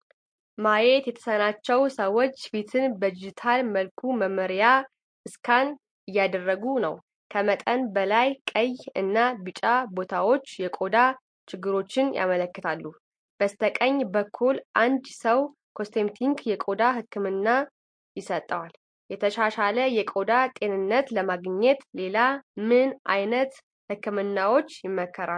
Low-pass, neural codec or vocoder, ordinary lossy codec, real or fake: 9.9 kHz; none; MP3, 48 kbps; real